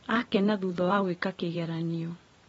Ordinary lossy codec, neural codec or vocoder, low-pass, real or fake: AAC, 24 kbps; vocoder, 44.1 kHz, 128 mel bands every 512 samples, BigVGAN v2; 19.8 kHz; fake